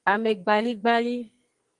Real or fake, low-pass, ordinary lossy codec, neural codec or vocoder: fake; 10.8 kHz; Opus, 24 kbps; codec, 44.1 kHz, 2.6 kbps, SNAC